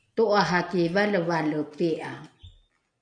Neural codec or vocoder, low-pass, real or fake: none; 9.9 kHz; real